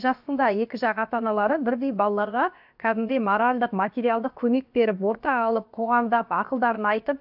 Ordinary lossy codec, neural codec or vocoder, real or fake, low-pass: AAC, 48 kbps; codec, 16 kHz, about 1 kbps, DyCAST, with the encoder's durations; fake; 5.4 kHz